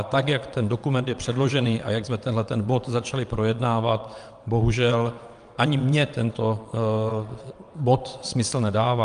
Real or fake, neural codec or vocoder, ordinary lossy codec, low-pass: fake; vocoder, 22.05 kHz, 80 mel bands, WaveNeXt; Opus, 32 kbps; 9.9 kHz